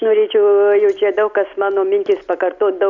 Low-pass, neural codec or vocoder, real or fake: 7.2 kHz; none; real